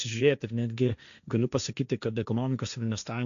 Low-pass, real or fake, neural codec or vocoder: 7.2 kHz; fake; codec, 16 kHz, 1.1 kbps, Voila-Tokenizer